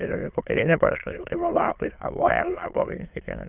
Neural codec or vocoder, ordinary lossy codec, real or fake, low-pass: autoencoder, 22.05 kHz, a latent of 192 numbers a frame, VITS, trained on many speakers; Opus, 24 kbps; fake; 3.6 kHz